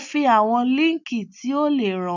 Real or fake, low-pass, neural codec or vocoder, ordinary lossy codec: real; 7.2 kHz; none; none